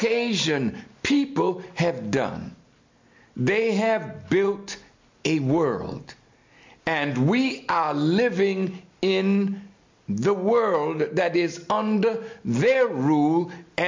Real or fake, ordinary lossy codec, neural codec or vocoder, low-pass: real; MP3, 48 kbps; none; 7.2 kHz